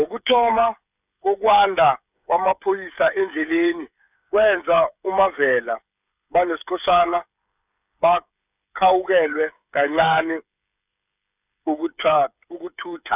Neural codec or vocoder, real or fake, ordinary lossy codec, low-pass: codec, 16 kHz, 8 kbps, FreqCodec, smaller model; fake; none; 3.6 kHz